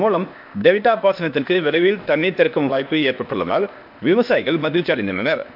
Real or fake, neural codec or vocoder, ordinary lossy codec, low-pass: fake; codec, 16 kHz, 0.8 kbps, ZipCodec; MP3, 48 kbps; 5.4 kHz